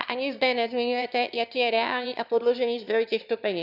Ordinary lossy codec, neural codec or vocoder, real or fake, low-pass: none; autoencoder, 22.05 kHz, a latent of 192 numbers a frame, VITS, trained on one speaker; fake; 5.4 kHz